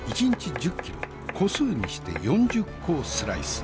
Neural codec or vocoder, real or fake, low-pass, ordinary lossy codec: none; real; none; none